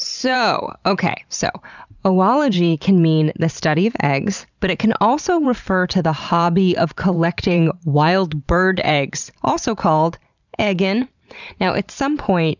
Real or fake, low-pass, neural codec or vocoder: fake; 7.2 kHz; vocoder, 44.1 kHz, 128 mel bands every 512 samples, BigVGAN v2